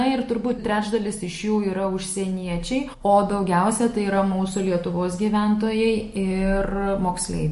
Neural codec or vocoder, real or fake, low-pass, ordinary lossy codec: none; real; 14.4 kHz; MP3, 48 kbps